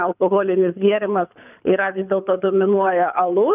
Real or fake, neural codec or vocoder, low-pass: fake; codec, 24 kHz, 6 kbps, HILCodec; 3.6 kHz